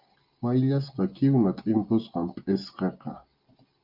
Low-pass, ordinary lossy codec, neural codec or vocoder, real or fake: 5.4 kHz; Opus, 24 kbps; none; real